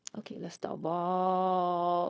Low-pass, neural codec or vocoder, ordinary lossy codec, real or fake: none; codec, 16 kHz, 0.4 kbps, LongCat-Audio-Codec; none; fake